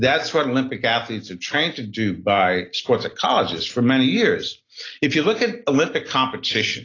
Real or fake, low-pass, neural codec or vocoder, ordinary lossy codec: real; 7.2 kHz; none; AAC, 32 kbps